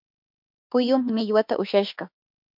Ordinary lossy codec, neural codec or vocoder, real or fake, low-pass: MP3, 48 kbps; autoencoder, 48 kHz, 32 numbers a frame, DAC-VAE, trained on Japanese speech; fake; 5.4 kHz